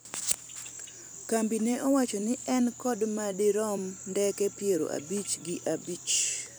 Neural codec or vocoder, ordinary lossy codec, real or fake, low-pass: vocoder, 44.1 kHz, 128 mel bands every 256 samples, BigVGAN v2; none; fake; none